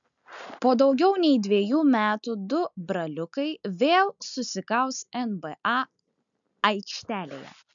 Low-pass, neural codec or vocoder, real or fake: 7.2 kHz; none; real